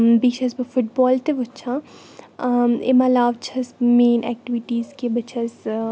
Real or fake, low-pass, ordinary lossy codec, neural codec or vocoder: real; none; none; none